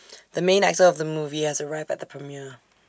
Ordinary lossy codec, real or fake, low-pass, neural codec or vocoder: none; real; none; none